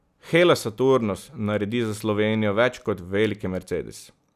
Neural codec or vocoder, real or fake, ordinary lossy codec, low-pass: none; real; none; 14.4 kHz